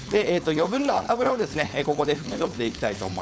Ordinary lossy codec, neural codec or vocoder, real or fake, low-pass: none; codec, 16 kHz, 4.8 kbps, FACodec; fake; none